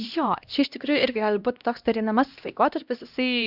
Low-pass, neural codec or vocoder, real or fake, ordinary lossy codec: 5.4 kHz; codec, 16 kHz, 1 kbps, X-Codec, HuBERT features, trained on LibriSpeech; fake; Opus, 64 kbps